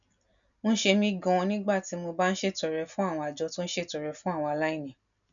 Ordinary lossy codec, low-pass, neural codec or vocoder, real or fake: none; 7.2 kHz; none; real